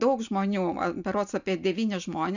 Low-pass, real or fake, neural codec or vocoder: 7.2 kHz; real; none